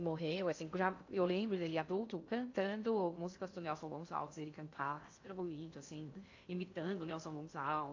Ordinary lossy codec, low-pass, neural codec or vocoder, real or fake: AAC, 48 kbps; 7.2 kHz; codec, 16 kHz in and 24 kHz out, 0.6 kbps, FocalCodec, streaming, 2048 codes; fake